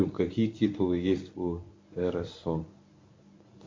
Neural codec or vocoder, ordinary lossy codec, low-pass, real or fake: codec, 24 kHz, 0.9 kbps, WavTokenizer, medium speech release version 1; MP3, 48 kbps; 7.2 kHz; fake